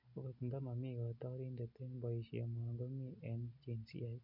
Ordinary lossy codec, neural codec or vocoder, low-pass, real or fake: none; none; 5.4 kHz; real